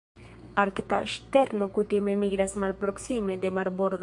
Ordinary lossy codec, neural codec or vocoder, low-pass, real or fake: MP3, 64 kbps; codec, 32 kHz, 1.9 kbps, SNAC; 10.8 kHz; fake